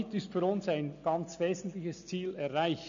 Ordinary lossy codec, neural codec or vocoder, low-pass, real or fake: MP3, 64 kbps; none; 7.2 kHz; real